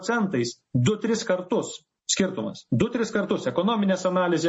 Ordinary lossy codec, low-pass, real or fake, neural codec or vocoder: MP3, 32 kbps; 10.8 kHz; real; none